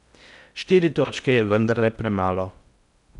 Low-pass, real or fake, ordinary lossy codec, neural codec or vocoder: 10.8 kHz; fake; none; codec, 16 kHz in and 24 kHz out, 0.6 kbps, FocalCodec, streaming, 4096 codes